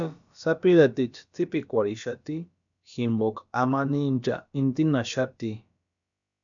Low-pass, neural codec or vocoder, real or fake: 7.2 kHz; codec, 16 kHz, about 1 kbps, DyCAST, with the encoder's durations; fake